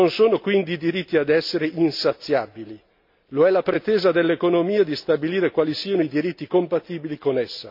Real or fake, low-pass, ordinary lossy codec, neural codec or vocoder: real; 5.4 kHz; MP3, 48 kbps; none